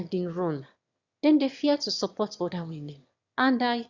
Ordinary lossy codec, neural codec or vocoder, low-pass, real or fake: Opus, 64 kbps; autoencoder, 22.05 kHz, a latent of 192 numbers a frame, VITS, trained on one speaker; 7.2 kHz; fake